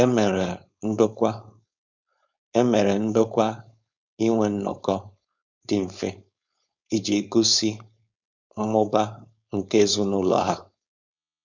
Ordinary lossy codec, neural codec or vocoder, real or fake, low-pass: none; codec, 16 kHz, 4.8 kbps, FACodec; fake; 7.2 kHz